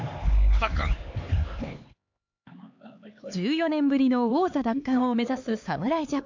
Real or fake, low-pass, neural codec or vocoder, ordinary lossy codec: fake; 7.2 kHz; codec, 16 kHz, 4 kbps, X-Codec, HuBERT features, trained on LibriSpeech; AAC, 48 kbps